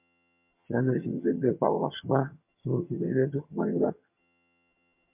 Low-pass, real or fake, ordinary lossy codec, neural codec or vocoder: 3.6 kHz; fake; MP3, 32 kbps; vocoder, 22.05 kHz, 80 mel bands, HiFi-GAN